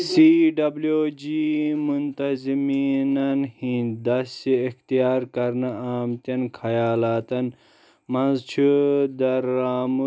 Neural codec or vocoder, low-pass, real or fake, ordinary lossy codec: none; none; real; none